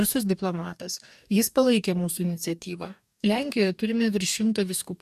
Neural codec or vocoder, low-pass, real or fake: codec, 44.1 kHz, 2.6 kbps, DAC; 14.4 kHz; fake